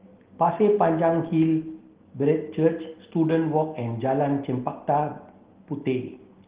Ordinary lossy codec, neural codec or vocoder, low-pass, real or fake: Opus, 16 kbps; none; 3.6 kHz; real